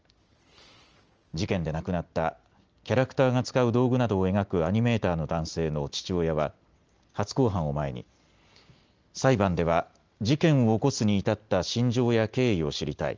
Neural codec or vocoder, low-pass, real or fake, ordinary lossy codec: none; 7.2 kHz; real; Opus, 24 kbps